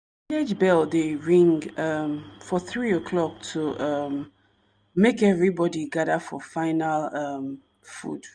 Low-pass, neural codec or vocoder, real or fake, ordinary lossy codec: 9.9 kHz; none; real; none